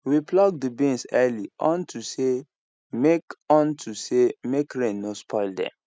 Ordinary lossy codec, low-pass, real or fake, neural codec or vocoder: none; none; real; none